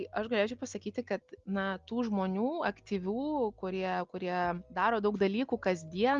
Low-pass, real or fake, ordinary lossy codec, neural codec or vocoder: 7.2 kHz; real; Opus, 32 kbps; none